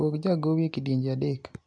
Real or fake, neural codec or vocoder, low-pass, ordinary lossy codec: real; none; 9.9 kHz; AAC, 64 kbps